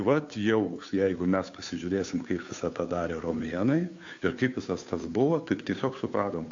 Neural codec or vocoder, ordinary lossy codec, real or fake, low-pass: codec, 16 kHz, 2 kbps, FunCodec, trained on Chinese and English, 25 frames a second; AAC, 48 kbps; fake; 7.2 kHz